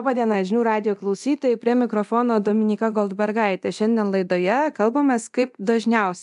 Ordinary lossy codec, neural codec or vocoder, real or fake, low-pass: AAC, 96 kbps; codec, 24 kHz, 0.9 kbps, DualCodec; fake; 10.8 kHz